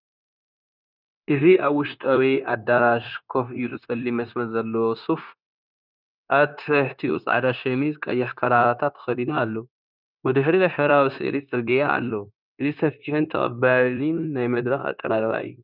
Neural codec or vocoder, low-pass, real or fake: codec, 16 kHz, 0.9 kbps, LongCat-Audio-Codec; 5.4 kHz; fake